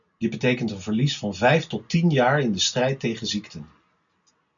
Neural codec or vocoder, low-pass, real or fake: none; 7.2 kHz; real